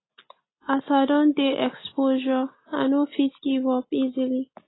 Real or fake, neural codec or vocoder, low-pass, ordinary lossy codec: real; none; 7.2 kHz; AAC, 16 kbps